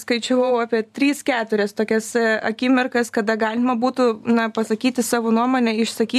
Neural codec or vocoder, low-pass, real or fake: vocoder, 44.1 kHz, 128 mel bands every 512 samples, BigVGAN v2; 14.4 kHz; fake